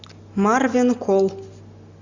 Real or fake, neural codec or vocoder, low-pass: real; none; 7.2 kHz